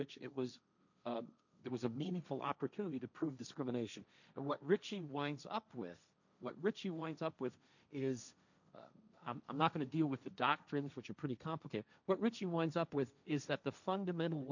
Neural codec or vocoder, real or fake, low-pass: codec, 16 kHz, 1.1 kbps, Voila-Tokenizer; fake; 7.2 kHz